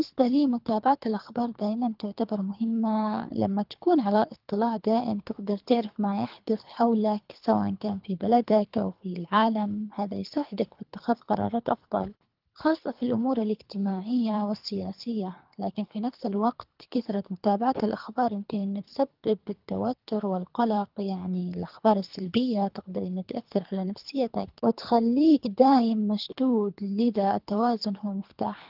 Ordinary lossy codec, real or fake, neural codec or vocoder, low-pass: Opus, 32 kbps; fake; codec, 24 kHz, 3 kbps, HILCodec; 5.4 kHz